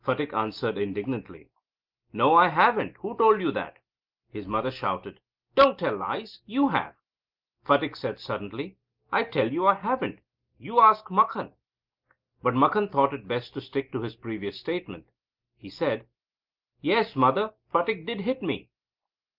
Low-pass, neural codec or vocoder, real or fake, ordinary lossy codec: 5.4 kHz; none; real; Opus, 24 kbps